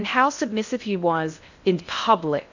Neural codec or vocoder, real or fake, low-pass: codec, 16 kHz in and 24 kHz out, 0.6 kbps, FocalCodec, streaming, 2048 codes; fake; 7.2 kHz